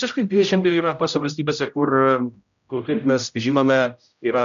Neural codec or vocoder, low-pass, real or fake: codec, 16 kHz, 0.5 kbps, X-Codec, HuBERT features, trained on general audio; 7.2 kHz; fake